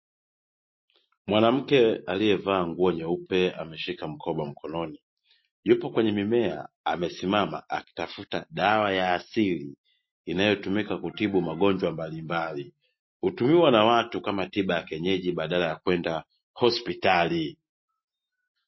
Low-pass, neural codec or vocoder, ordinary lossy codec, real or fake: 7.2 kHz; none; MP3, 24 kbps; real